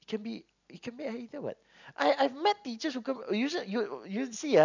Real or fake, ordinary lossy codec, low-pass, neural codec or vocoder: real; none; 7.2 kHz; none